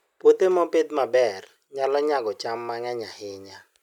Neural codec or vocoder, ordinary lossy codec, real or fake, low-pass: none; none; real; 19.8 kHz